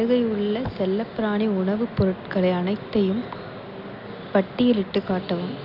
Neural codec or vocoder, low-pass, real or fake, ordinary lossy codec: none; 5.4 kHz; real; none